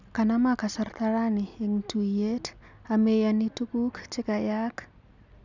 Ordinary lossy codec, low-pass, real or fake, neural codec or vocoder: none; 7.2 kHz; real; none